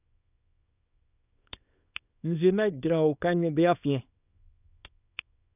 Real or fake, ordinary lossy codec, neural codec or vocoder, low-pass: fake; none; codec, 24 kHz, 0.9 kbps, WavTokenizer, small release; 3.6 kHz